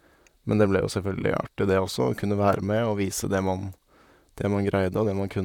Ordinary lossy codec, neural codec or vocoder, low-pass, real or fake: none; vocoder, 44.1 kHz, 128 mel bands, Pupu-Vocoder; 19.8 kHz; fake